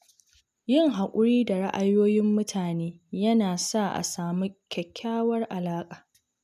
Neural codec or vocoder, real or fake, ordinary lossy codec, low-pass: none; real; none; 14.4 kHz